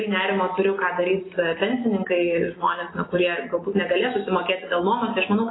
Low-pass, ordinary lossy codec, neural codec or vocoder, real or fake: 7.2 kHz; AAC, 16 kbps; none; real